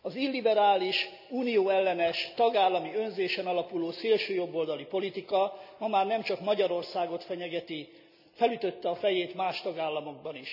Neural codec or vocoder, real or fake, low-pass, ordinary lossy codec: none; real; 5.4 kHz; none